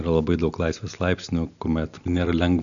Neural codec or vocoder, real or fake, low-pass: none; real; 7.2 kHz